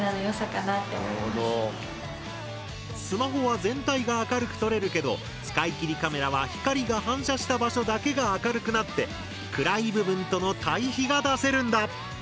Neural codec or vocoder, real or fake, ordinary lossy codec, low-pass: none; real; none; none